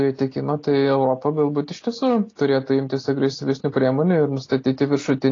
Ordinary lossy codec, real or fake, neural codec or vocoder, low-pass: AAC, 32 kbps; real; none; 7.2 kHz